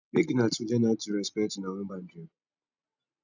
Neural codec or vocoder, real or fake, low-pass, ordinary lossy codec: none; real; 7.2 kHz; none